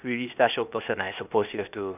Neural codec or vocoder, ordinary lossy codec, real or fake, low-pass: codec, 16 kHz, 0.8 kbps, ZipCodec; none; fake; 3.6 kHz